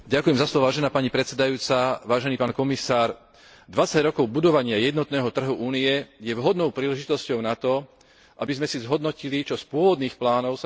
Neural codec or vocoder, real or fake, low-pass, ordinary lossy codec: none; real; none; none